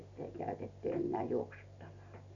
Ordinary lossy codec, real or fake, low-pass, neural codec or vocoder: none; real; 7.2 kHz; none